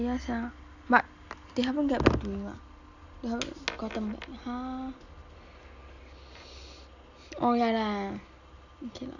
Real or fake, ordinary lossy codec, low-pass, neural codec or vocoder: real; none; 7.2 kHz; none